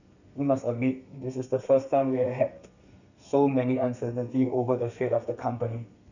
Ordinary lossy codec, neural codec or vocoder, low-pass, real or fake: none; codec, 32 kHz, 1.9 kbps, SNAC; 7.2 kHz; fake